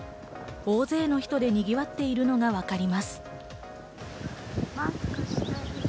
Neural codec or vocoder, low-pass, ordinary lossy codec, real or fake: none; none; none; real